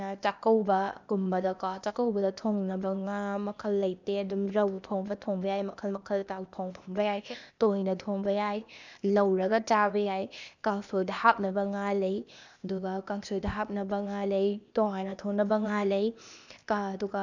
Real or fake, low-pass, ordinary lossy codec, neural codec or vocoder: fake; 7.2 kHz; none; codec, 16 kHz, 0.8 kbps, ZipCodec